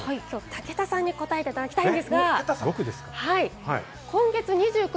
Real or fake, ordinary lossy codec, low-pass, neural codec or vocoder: real; none; none; none